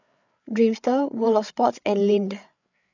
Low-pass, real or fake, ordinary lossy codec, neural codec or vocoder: 7.2 kHz; fake; none; codec, 16 kHz, 8 kbps, FreqCodec, larger model